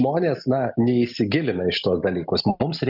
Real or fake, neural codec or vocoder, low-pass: real; none; 5.4 kHz